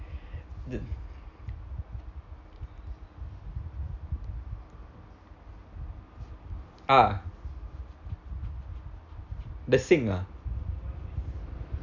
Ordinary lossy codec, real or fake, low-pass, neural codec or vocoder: none; real; 7.2 kHz; none